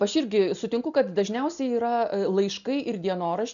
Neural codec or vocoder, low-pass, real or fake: none; 7.2 kHz; real